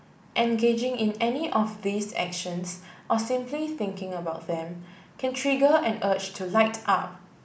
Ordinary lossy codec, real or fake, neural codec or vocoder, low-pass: none; real; none; none